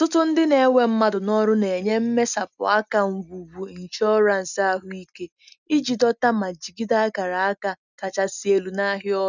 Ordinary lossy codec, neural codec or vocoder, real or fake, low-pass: none; none; real; 7.2 kHz